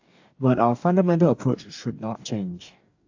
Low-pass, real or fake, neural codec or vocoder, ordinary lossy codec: 7.2 kHz; fake; codec, 44.1 kHz, 2.6 kbps, DAC; AAC, 48 kbps